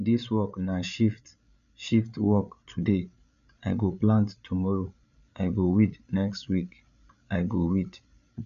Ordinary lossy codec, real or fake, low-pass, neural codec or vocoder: none; fake; 7.2 kHz; codec, 16 kHz, 8 kbps, FreqCodec, larger model